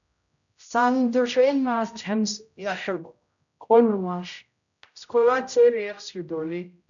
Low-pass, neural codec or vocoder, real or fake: 7.2 kHz; codec, 16 kHz, 0.5 kbps, X-Codec, HuBERT features, trained on general audio; fake